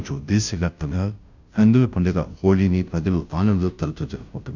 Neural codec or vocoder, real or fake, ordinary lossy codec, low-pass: codec, 16 kHz, 0.5 kbps, FunCodec, trained on Chinese and English, 25 frames a second; fake; none; 7.2 kHz